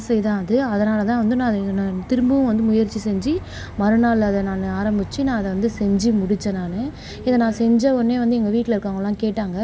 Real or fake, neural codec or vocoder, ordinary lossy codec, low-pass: real; none; none; none